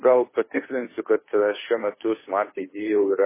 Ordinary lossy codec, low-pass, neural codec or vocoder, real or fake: MP3, 16 kbps; 3.6 kHz; codec, 16 kHz, 2 kbps, FunCodec, trained on Chinese and English, 25 frames a second; fake